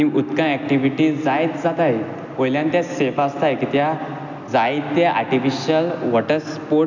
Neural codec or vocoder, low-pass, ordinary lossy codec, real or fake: none; 7.2 kHz; none; real